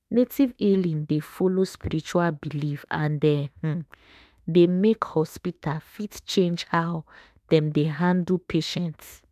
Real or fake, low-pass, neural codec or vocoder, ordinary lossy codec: fake; 14.4 kHz; autoencoder, 48 kHz, 32 numbers a frame, DAC-VAE, trained on Japanese speech; none